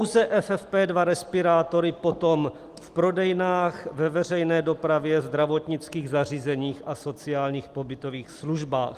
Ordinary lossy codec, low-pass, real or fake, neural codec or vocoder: Opus, 24 kbps; 14.4 kHz; real; none